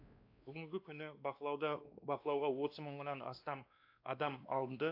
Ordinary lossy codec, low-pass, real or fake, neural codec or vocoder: AAC, 32 kbps; 5.4 kHz; fake; codec, 16 kHz, 2 kbps, X-Codec, WavLM features, trained on Multilingual LibriSpeech